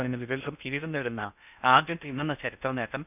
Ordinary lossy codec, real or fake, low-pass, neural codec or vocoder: none; fake; 3.6 kHz; codec, 16 kHz in and 24 kHz out, 0.6 kbps, FocalCodec, streaming, 2048 codes